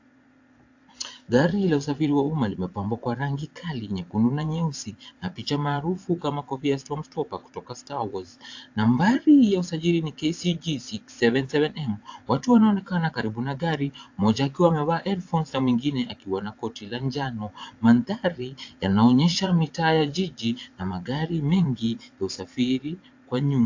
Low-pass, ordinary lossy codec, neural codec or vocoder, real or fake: 7.2 kHz; AAC, 48 kbps; vocoder, 22.05 kHz, 80 mel bands, Vocos; fake